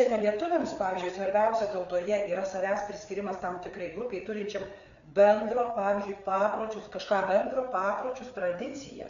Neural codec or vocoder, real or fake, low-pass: codec, 16 kHz, 4 kbps, FreqCodec, larger model; fake; 7.2 kHz